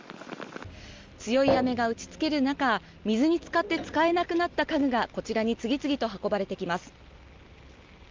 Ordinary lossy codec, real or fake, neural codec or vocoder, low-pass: Opus, 32 kbps; real; none; 7.2 kHz